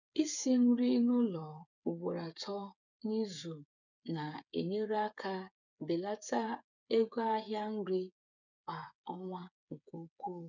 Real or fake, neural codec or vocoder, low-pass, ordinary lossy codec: fake; codec, 16 kHz, 8 kbps, FreqCodec, smaller model; 7.2 kHz; none